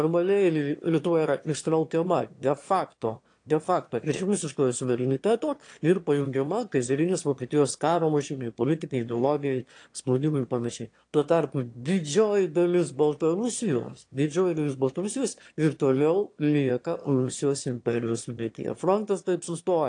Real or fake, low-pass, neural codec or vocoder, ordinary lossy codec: fake; 9.9 kHz; autoencoder, 22.05 kHz, a latent of 192 numbers a frame, VITS, trained on one speaker; AAC, 48 kbps